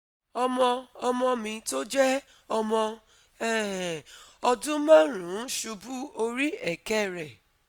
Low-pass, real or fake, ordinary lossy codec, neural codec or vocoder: none; real; none; none